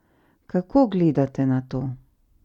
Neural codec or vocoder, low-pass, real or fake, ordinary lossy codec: none; 19.8 kHz; real; none